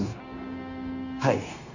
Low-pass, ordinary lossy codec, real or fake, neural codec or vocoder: 7.2 kHz; none; fake; codec, 16 kHz, 0.9 kbps, LongCat-Audio-Codec